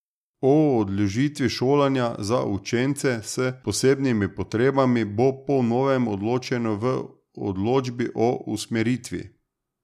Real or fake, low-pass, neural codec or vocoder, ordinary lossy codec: real; 14.4 kHz; none; none